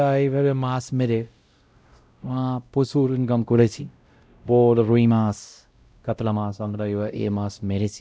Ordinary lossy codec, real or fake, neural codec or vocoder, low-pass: none; fake; codec, 16 kHz, 0.5 kbps, X-Codec, WavLM features, trained on Multilingual LibriSpeech; none